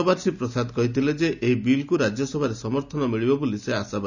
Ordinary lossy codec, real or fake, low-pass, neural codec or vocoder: none; real; 7.2 kHz; none